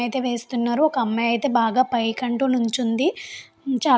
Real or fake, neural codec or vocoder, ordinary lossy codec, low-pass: real; none; none; none